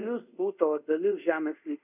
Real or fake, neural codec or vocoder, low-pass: fake; codec, 24 kHz, 0.5 kbps, DualCodec; 3.6 kHz